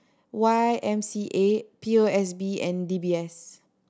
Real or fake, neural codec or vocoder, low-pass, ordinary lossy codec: real; none; none; none